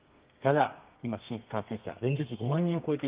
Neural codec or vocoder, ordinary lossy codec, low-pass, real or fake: codec, 32 kHz, 1.9 kbps, SNAC; Opus, 32 kbps; 3.6 kHz; fake